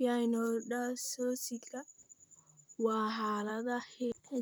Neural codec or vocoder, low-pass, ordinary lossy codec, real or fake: vocoder, 44.1 kHz, 128 mel bands, Pupu-Vocoder; none; none; fake